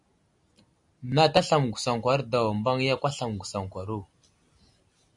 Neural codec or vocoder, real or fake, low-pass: none; real; 10.8 kHz